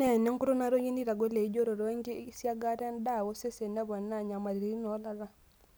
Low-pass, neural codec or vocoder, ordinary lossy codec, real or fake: none; none; none; real